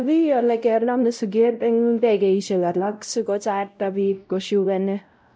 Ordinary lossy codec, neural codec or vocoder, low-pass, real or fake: none; codec, 16 kHz, 0.5 kbps, X-Codec, WavLM features, trained on Multilingual LibriSpeech; none; fake